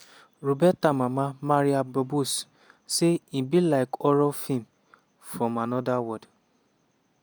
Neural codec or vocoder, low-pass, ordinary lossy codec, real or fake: none; none; none; real